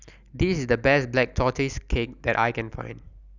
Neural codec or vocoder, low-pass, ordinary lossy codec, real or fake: none; 7.2 kHz; none; real